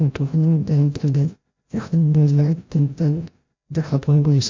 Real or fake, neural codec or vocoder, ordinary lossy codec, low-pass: fake; codec, 16 kHz, 0.5 kbps, FreqCodec, larger model; MP3, 48 kbps; 7.2 kHz